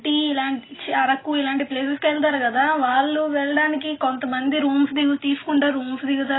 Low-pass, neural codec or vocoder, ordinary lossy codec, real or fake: 7.2 kHz; none; AAC, 16 kbps; real